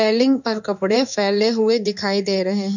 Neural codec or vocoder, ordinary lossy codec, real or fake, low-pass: codec, 16 kHz in and 24 kHz out, 1 kbps, XY-Tokenizer; none; fake; 7.2 kHz